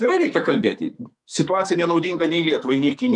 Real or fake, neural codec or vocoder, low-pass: fake; codec, 44.1 kHz, 2.6 kbps, SNAC; 10.8 kHz